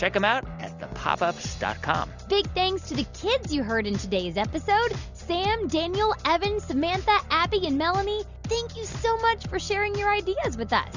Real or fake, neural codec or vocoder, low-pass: real; none; 7.2 kHz